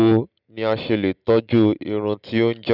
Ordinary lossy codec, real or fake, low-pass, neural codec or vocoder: none; real; 5.4 kHz; none